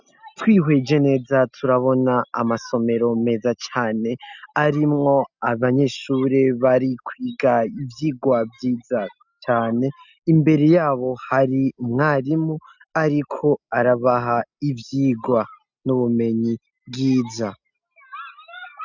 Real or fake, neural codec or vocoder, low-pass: real; none; 7.2 kHz